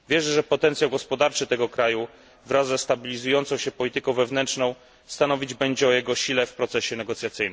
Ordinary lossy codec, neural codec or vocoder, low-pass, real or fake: none; none; none; real